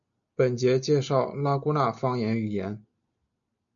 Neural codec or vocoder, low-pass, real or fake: none; 7.2 kHz; real